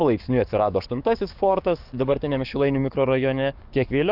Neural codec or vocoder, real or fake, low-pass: codec, 44.1 kHz, 7.8 kbps, DAC; fake; 5.4 kHz